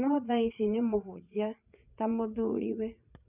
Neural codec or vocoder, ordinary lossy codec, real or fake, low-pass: vocoder, 44.1 kHz, 80 mel bands, Vocos; none; fake; 3.6 kHz